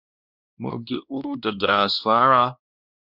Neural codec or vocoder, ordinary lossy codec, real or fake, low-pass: codec, 16 kHz, 1 kbps, X-Codec, WavLM features, trained on Multilingual LibriSpeech; Opus, 64 kbps; fake; 5.4 kHz